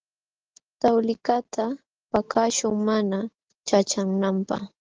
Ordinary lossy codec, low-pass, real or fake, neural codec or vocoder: Opus, 16 kbps; 7.2 kHz; real; none